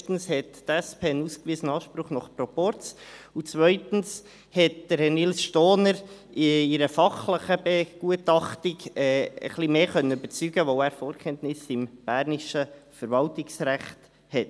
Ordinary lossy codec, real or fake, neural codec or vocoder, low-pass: none; real; none; none